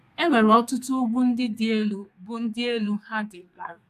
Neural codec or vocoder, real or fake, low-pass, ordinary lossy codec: codec, 32 kHz, 1.9 kbps, SNAC; fake; 14.4 kHz; AAC, 96 kbps